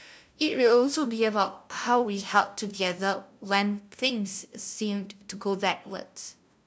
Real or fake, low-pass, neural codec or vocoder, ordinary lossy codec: fake; none; codec, 16 kHz, 0.5 kbps, FunCodec, trained on LibriTTS, 25 frames a second; none